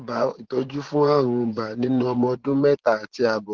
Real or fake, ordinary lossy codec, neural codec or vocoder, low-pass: fake; Opus, 16 kbps; vocoder, 24 kHz, 100 mel bands, Vocos; 7.2 kHz